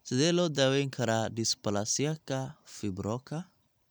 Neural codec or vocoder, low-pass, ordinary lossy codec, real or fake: none; none; none; real